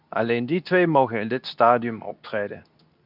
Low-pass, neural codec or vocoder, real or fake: 5.4 kHz; codec, 24 kHz, 0.9 kbps, WavTokenizer, medium speech release version 2; fake